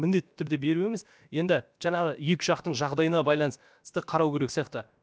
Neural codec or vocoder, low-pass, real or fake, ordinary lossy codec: codec, 16 kHz, about 1 kbps, DyCAST, with the encoder's durations; none; fake; none